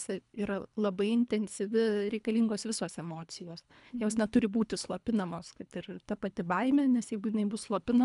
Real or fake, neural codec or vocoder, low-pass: fake; codec, 24 kHz, 3 kbps, HILCodec; 10.8 kHz